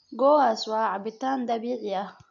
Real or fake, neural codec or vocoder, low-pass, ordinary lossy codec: real; none; 7.2 kHz; none